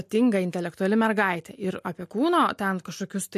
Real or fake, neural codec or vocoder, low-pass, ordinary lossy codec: real; none; 14.4 kHz; MP3, 64 kbps